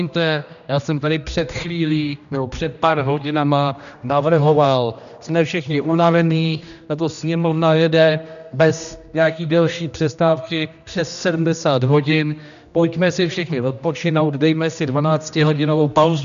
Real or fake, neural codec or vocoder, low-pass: fake; codec, 16 kHz, 1 kbps, X-Codec, HuBERT features, trained on general audio; 7.2 kHz